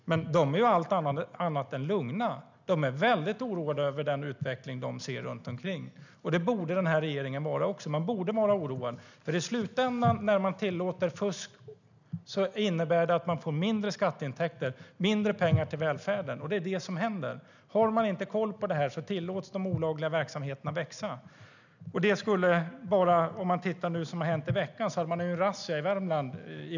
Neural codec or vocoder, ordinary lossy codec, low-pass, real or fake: none; none; 7.2 kHz; real